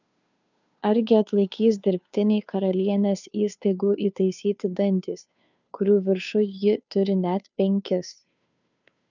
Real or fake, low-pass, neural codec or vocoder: fake; 7.2 kHz; codec, 16 kHz, 2 kbps, FunCodec, trained on Chinese and English, 25 frames a second